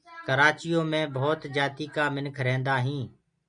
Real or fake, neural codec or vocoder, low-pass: real; none; 9.9 kHz